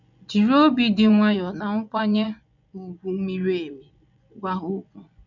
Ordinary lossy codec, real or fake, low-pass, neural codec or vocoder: none; fake; 7.2 kHz; vocoder, 24 kHz, 100 mel bands, Vocos